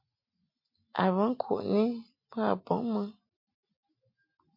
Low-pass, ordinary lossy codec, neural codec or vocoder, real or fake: 5.4 kHz; MP3, 32 kbps; none; real